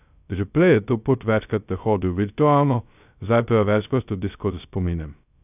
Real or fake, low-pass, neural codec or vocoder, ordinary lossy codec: fake; 3.6 kHz; codec, 16 kHz, 0.2 kbps, FocalCodec; none